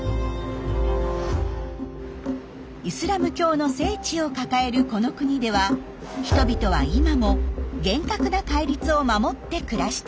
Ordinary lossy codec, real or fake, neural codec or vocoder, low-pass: none; real; none; none